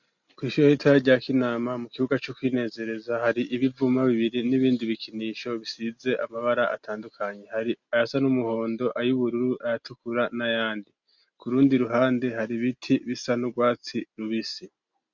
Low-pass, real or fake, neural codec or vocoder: 7.2 kHz; real; none